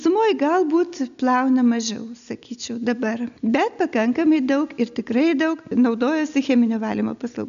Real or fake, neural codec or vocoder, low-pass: real; none; 7.2 kHz